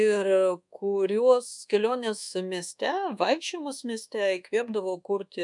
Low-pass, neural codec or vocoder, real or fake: 10.8 kHz; codec, 24 kHz, 1.2 kbps, DualCodec; fake